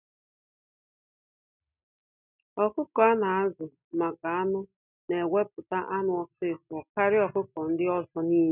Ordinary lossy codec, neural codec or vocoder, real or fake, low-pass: none; none; real; 3.6 kHz